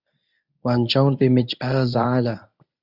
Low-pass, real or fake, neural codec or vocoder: 5.4 kHz; fake; codec, 24 kHz, 0.9 kbps, WavTokenizer, medium speech release version 1